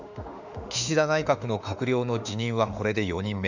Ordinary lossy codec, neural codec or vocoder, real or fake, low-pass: none; autoencoder, 48 kHz, 32 numbers a frame, DAC-VAE, trained on Japanese speech; fake; 7.2 kHz